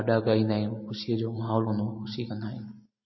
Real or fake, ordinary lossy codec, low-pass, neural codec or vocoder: real; MP3, 24 kbps; 7.2 kHz; none